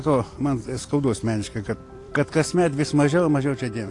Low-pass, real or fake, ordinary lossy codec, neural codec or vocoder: 10.8 kHz; fake; AAC, 48 kbps; vocoder, 44.1 kHz, 128 mel bands every 256 samples, BigVGAN v2